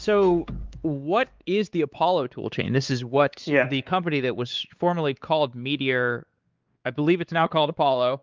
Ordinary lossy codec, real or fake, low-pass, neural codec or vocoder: Opus, 32 kbps; fake; 7.2 kHz; codec, 16 kHz, 4 kbps, X-Codec, WavLM features, trained on Multilingual LibriSpeech